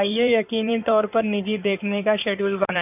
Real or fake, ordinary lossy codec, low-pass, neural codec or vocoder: fake; none; 3.6 kHz; codec, 16 kHz in and 24 kHz out, 2.2 kbps, FireRedTTS-2 codec